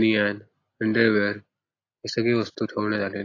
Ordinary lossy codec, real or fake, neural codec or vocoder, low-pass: AAC, 32 kbps; real; none; 7.2 kHz